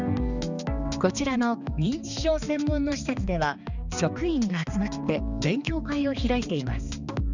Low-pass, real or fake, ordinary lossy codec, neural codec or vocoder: 7.2 kHz; fake; none; codec, 16 kHz, 2 kbps, X-Codec, HuBERT features, trained on balanced general audio